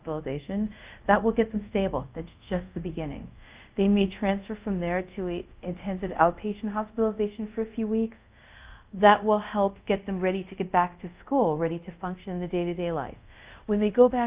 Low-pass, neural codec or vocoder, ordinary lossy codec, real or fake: 3.6 kHz; codec, 24 kHz, 0.5 kbps, DualCodec; Opus, 24 kbps; fake